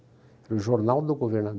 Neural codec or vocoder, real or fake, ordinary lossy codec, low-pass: none; real; none; none